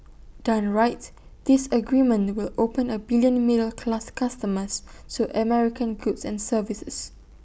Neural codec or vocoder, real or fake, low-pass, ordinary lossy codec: none; real; none; none